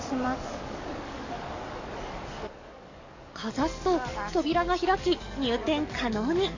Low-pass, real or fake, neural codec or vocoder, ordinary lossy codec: 7.2 kHz; fake; codec, 44.1 kHz, 7.8 kbps, DAC; AAC, 48 kbps